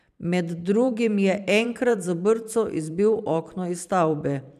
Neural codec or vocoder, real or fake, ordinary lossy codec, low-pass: vocoder, 44.1 kHz, 128 mel bands every 512 samples, BigVGAN v2; fake; none; 14.4 kHz